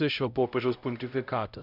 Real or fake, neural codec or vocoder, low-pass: fake; codec, 16 kHz, 0.5 kbps, X-Codec, HuBERT features, trained on LibriSpeech; 5.4 kHz